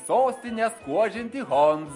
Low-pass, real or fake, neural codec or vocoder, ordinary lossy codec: 10.8 kHz; real; none; MP3, 48 kbps